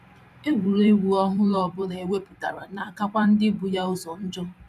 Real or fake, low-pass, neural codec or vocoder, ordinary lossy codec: fake; 14.4 kHz; vocoder, 44.1 kHz, 128 mel bands every 512 samples, BigVGAN v2; none